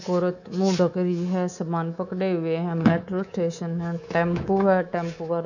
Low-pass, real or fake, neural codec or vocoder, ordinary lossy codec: 7.2 kHz; fake; codec, 24 kHz, 3.1 kbps, DualCodec; none